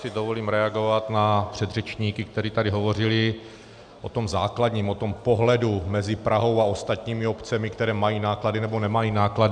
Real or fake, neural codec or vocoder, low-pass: real; none; 9.9 kHz